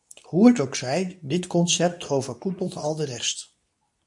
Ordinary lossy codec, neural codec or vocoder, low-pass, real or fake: MP3, 96 kbps; codec, 24 kHz, 0.9 kbps, WavTokenizer, medium speech release version 2; 10.8 kHz; fake